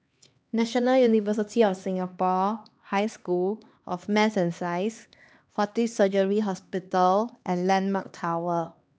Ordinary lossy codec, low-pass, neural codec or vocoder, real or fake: none; none; codec, 16 kHz, 2 kbps, X-Codec, HuBERT features, trained on LibriSpeech; fake